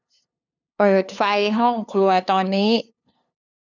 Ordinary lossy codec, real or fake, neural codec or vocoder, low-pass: none; fake; codec, 16 kHz, 2 kbps, FunCodec, trained on LibriTTS, 25 frames a second; 7.2 kHz